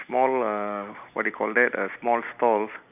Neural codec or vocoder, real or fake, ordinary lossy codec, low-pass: vocoder, 44.1 kHz, 128 mel bands every 256 samples, BigVGAN v2; fake; none; 3.6 kHz